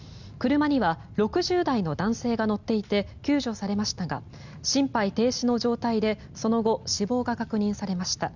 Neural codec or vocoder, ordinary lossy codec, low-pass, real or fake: none; Opus, 64 kbps; 7.2 kHz; real